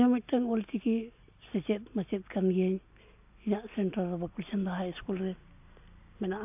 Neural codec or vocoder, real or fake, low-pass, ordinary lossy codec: none; real; 3.6 kHz; AAC, 32 kbps